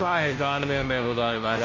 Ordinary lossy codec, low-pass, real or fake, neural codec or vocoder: MP3, 32 kbps; 7.2 kHz; fake; codec, 16 kHz, 0.5 kbps, FunCodec, trained on Chinese and English, 25 frames a second